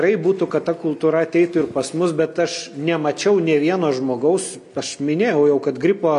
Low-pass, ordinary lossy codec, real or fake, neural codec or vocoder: 10.8 kHz; MP3, 64 kbps; real; none